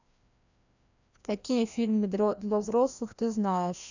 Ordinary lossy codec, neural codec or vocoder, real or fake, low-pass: none; codec, 16 kHz, 1 kbps, FreqCodec, larger model; fake; 7.2 kHz